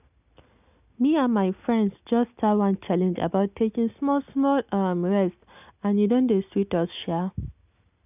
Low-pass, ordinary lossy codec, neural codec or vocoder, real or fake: 3.6 kHz; none; none; real